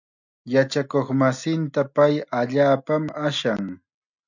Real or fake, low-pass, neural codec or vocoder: real; 7.2 kHz; none